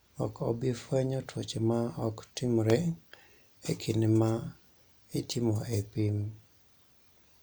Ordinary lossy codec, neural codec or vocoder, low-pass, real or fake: none; none; none; real